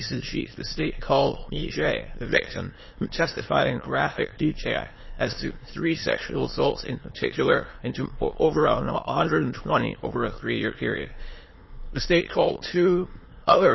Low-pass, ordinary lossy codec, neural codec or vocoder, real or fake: 7.2 kHz; MP3, 24 kbps; autoencoder, 22.05 kHz, a latent of 192 numbers a frame, VITS, trained on many speakers; fake